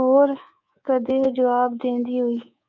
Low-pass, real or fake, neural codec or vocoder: 7.2 kHz; fake; codec, 24 kHz, 3.1 kbps, DualCodec